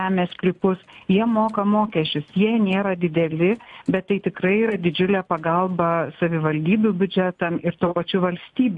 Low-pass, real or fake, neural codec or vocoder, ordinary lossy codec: 10.8 kHz; real; none; AAC, 64 kbps